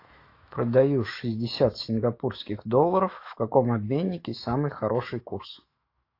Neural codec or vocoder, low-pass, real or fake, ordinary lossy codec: autoencoder, 48 kHz, 128 numbers a frame, DAC-VAE, trained on Japanese speech; 5.4 kHz; fake; AAC, 32 kbps